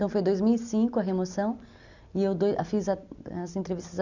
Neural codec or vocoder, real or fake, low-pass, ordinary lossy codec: none; real; 7.2 kHz; none